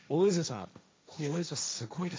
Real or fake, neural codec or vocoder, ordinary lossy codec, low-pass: fake; codec, 16 kHz, 1.1 kbps, Voila-Tokenizer; none; none